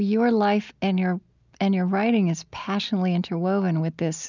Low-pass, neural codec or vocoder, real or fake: 7.2 kHz; none; real